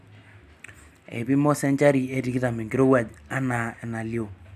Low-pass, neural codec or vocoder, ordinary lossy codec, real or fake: 14.4 kHz; none; none; real